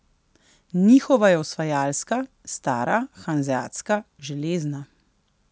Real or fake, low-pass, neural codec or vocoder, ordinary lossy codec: real; none; none; none